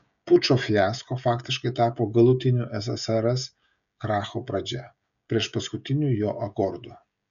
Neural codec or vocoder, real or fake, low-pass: codec, 16 kHz, 16 kbps, FreqCodec, smaller model; fake; 7.2 kHz